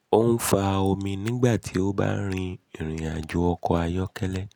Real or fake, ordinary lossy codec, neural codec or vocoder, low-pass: real; none; none; none